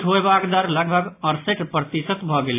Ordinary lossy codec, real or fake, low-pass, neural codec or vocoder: AAC, 24 kbps; real; 3.6 kHz; none